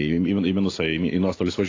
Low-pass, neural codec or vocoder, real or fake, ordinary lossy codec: 7.2 kHz; none; real; AAC, 32 kbps